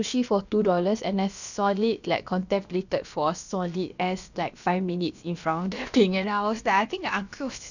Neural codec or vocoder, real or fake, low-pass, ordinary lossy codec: codec, 16 kHz, about 1 kbps, DyCAST, with the encoder's durations; fake; 7.2 kHz; none